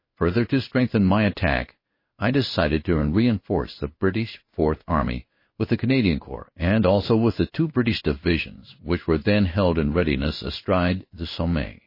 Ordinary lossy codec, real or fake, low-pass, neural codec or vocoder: MP3, 24 kbps; fake; 5.4 kHz; codec, 16 kHz in and 24 kHz out, 1 kbps, XY-Tokenizer